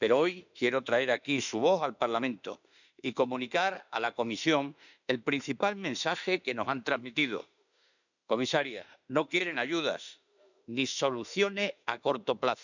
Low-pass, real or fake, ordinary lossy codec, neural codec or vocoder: 7.2 kHz; fake; none; autoencoder, 48 kHz, 32 numbers a frame, DAC-VAE, trained on Japanese speech